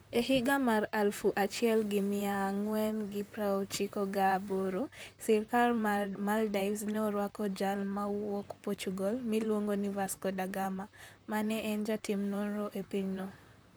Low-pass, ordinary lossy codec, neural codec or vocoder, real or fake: none; none; vocoder, 44.1 kHz, 128 mel bands, Pupu-Vocoder; fake